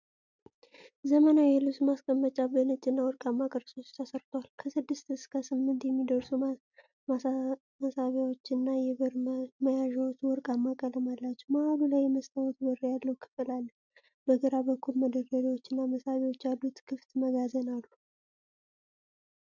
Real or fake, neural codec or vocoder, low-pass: real; none; 7.2 kHz